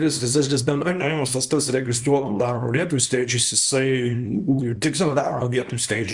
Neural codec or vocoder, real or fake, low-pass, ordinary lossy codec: codec, 24 kHz, 0.9 kbps, WavTokenizer, small release; fake; 10.8 kHz; Opus, 64 kbps